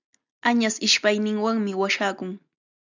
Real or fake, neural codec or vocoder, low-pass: real; none; 7.2 kHz